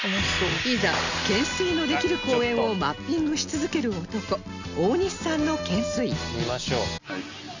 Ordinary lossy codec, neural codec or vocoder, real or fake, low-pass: none; none; real; 7.2 kHz